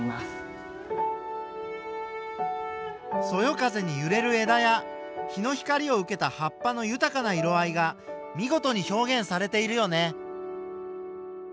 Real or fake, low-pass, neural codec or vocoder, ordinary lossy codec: real; none; none; none